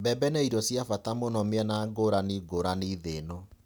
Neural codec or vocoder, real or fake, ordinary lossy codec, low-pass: none; real; none; none